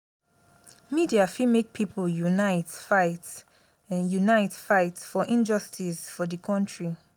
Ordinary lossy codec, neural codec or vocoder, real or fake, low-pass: none; none; real; none